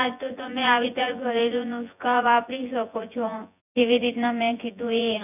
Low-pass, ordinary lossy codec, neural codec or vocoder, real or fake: 3.6 kHz; none; vocoder, 24 kHz, 100 mel bands, Vocos; fake